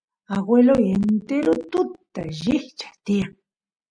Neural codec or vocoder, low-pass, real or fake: none; 9.9 kHz; real